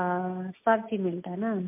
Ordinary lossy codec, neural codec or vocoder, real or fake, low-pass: MP3, 24 kbps; none; real; 3.6 kHz